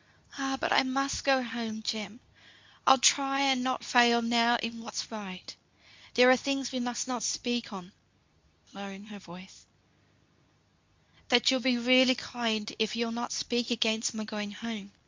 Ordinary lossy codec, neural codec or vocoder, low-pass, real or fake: MP3, 64 kbps; codec, 24 kHz, 0.9 kbps, WavTokenizer, medium speech release version 2; 7.2 kHz; fake